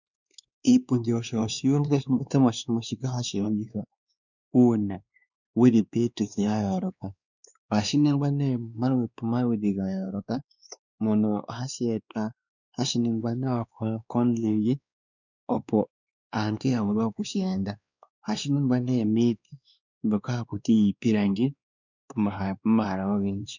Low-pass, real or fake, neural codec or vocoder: 7.2 kHz; fake; codec, 16 kHz, 2 kbps, X-Codec, WavLM features, trained on Multilingual LibriSpeech